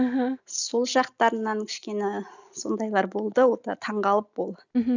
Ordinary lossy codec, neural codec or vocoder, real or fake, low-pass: none; none; real; 7.2 kHz